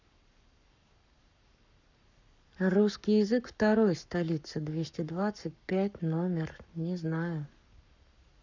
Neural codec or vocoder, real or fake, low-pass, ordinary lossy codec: codec, 44.1 kHz, 7.8 kbps, Pupu-Codec; fake; 7.2 kHz; none